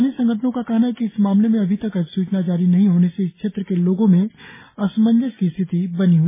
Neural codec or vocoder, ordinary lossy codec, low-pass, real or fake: none; MP3, 16 kbps; 3.6 kHz; real